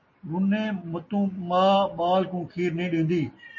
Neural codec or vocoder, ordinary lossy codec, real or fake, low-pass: none; MP3, 32 kbps; real; 7.2 kHz